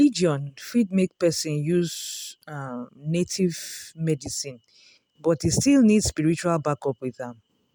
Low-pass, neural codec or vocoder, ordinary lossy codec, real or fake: none; none; none; real